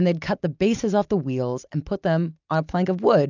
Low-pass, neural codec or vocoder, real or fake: 7.2 kHz; none; real